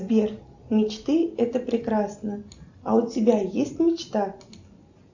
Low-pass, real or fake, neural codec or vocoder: 7.2 kHz; real; none